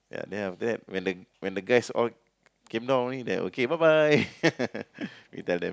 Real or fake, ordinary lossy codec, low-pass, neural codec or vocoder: real; none; none; none